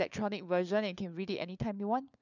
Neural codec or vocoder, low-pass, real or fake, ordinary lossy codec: codec, 16 kHz, 4 kbps, FunCodec, trained on LibriTTS, 50 frames a second; 7.2 kHz; fake; none